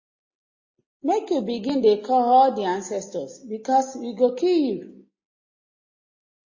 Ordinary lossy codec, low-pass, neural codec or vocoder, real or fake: MP3, 32 kbps; 7.2 kHz; none; real